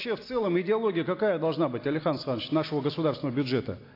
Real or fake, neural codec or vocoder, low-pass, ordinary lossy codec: real; none; 5.4 kHz; AAC, 32 kbps